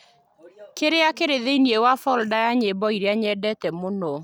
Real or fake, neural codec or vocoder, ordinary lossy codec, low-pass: real; none; none; 19.8 kHz